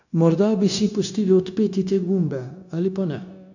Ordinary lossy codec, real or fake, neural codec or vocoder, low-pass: none; fake; codec, 16 kHz, 0.9 kbps, LongCat-Audio-Codec; 7.2 kHz